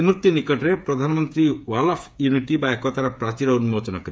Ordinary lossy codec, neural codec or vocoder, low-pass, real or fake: none; codec, 16 kHz, 8 kbps, FreqCodec, smaller model; none; fake